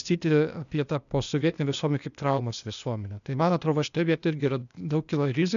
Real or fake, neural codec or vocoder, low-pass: fake; codec, 16 kHz, 0.8 kbps, ZipCodec; 7.2 kHz